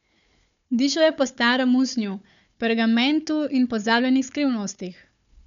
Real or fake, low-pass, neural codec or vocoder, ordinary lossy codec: fake; 7.2 kHz; codec, 16 kHz, 4 kbps, FunCodec, trained on Chinese and English, 50 frames a second; none